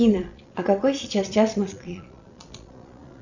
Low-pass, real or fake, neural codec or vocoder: 7.2 kHz; fake; vocoder, 22.05 kHz, 80 mel bands, Vocos